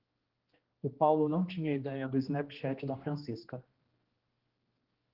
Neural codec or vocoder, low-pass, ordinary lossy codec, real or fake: codec, 16 kHz, 2 kbps, X-Codec, HuBERT features, trained on general audio; 5.4 kHz; Opus, 16 kbps; fake